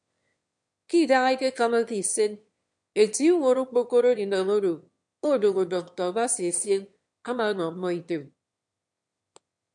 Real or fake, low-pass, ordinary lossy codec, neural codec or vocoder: fake; 9.9 kHz; MP3, 64 kbps; autoencoder, 22.05 kHz, a latent of 192 numbers a frame, VITS, trained on one speaker